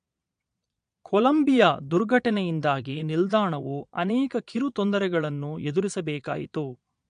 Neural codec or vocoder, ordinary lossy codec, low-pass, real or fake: vocoder, 22.05 kHz, 80 mel bands, Vocos; MP3, 64 kbps; 9.9 kHz; fake